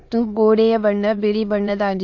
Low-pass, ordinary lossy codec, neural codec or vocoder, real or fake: 7.2 kHz; AAC, 48 kbps; autoencoder, 22.05 kHz, a latent of 192 numbers a frame, VITS, trained on many speakers; fake